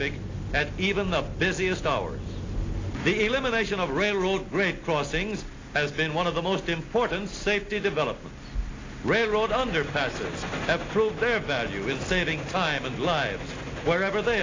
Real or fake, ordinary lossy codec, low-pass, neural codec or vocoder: real; AAC, 32 kbps; 7.2 kHz; none